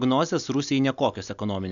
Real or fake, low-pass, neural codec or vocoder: real; 7.2 kHz; none